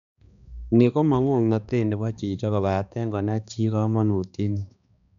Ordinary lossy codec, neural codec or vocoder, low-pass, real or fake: none; codec, 16 kHz, 2 kbps, X-Codec, HuBERT features, trained on balanced general audio; 7.2 kHz; fake